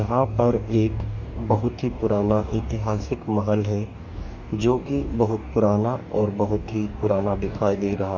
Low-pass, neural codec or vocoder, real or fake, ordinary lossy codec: 7.2 kHz; codec, 44.1 kHz, 2.6 kbps, DAC; fake; Opus, 64 kbps